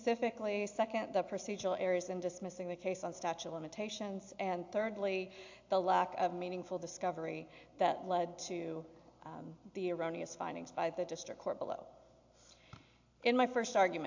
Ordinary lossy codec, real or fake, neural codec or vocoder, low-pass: AAC, 48 kbps; real; none; 7.2 kHz